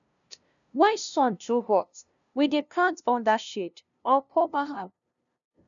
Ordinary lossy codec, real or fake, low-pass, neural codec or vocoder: none; fake; 7.2 kHz; codec, 16 kHz, 0.5 kbps, FunCodec, trained on LibriTTS, 25 frames a second